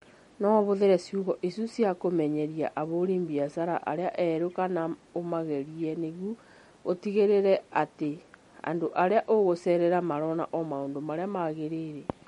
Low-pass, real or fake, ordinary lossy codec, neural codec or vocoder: 19.8 kHz; real; MP3, 48 kbps; none